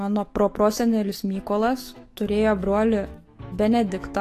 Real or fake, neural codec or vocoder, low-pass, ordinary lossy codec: fake; autoencoder, 48 kHz, 128 numbers a frame, DAC-VAE, trained on Japanese speech; 14.4 kHz; AAC, 48 kbps